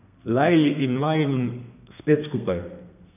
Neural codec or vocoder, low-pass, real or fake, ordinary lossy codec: codec, 32 kHz, 1.9 kbps, SNAC; 3.6 kHz; fake; none